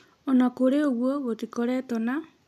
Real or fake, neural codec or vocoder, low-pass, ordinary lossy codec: real; none; 14.4 kHz; none